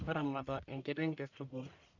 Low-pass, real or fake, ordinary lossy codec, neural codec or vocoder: 7.2 kHz; fake; MP3, 64 kbps; codec, 44.1 kHz, 1.7 kbps, Pupu-Codec